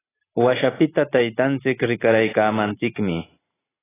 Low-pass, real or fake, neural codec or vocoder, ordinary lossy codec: 3.6 kHz; real; none; AAC, 16 kbps